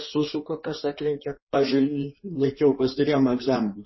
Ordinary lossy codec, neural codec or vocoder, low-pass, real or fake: MP3, 24 kbps; codec, 16 kHz in and 24 kHz out, 1.1 kbps, FireRedTTS-2 codec; 7.2 kHz; fake